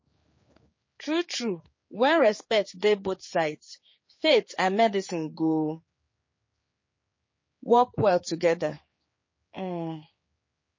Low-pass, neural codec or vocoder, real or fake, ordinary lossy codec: 7.2 kHz; codec, 16 kHz, 4 kbps, X-Codec, HuBERT features, trained on general audio; fake; MP3, 32 kbps